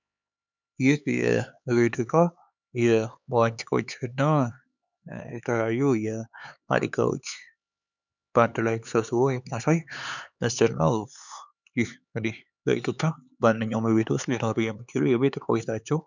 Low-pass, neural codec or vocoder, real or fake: 7.2 kHz; codec, 16 kHz, 4 kbps, X-Codec, HuBERT features, trained on LibriSpeech; fake